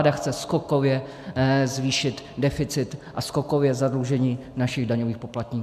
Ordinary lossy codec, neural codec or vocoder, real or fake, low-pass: AAC, 96 kbps; none; real; 14.4 kHz